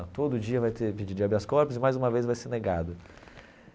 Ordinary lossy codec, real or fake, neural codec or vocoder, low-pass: none; real; none; none